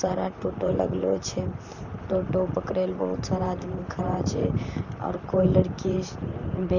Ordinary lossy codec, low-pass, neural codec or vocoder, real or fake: none; 7.2 kHz; vocoder, 44.1 kHz, 128 mel bands, Pupu-Vocoder; fake